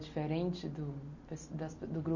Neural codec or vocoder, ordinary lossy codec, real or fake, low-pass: none; none; real; 7.2 kHz